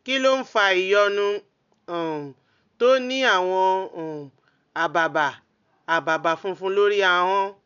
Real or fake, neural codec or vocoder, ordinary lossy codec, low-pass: real; none; none; 7.2 kHz